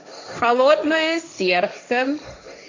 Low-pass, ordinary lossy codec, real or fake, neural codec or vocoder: 7.2 kHz; AAC, 48 kbps; fake; codec, 16 kHz, 1.1 kbps, Voila-Tokenizer